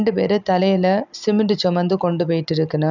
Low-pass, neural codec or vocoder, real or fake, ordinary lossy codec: 7.2 kHz; none; real; none